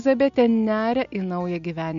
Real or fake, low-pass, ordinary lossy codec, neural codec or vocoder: real; 7.2 kHz; AAC, 64 kbps; none